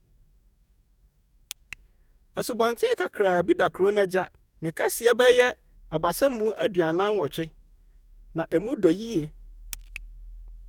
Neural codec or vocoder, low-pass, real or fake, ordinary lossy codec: codec, 44.1 kHz, 2.6 kbps, DAC; 19.8 kHz; fake; none